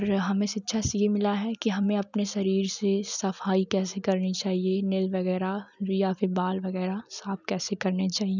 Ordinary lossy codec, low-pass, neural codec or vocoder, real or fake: none; 7.2 kHz; none; real